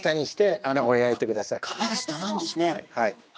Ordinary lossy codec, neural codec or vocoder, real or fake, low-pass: none; codec, 16 kHz, 2 kbps, X-Codec, HuBERT features, trained on general audio; fake; none